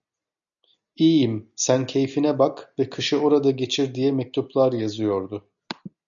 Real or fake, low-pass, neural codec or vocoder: real; 7.2 kHz; none